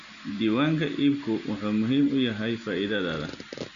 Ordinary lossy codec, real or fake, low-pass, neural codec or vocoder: none; real; 7.2 kHz; none